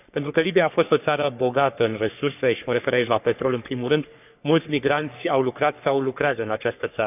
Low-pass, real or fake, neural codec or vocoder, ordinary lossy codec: 3.6 kHz; fake; codec, 44.1 kHz, 3.4 kbps, Pupu-Codec; none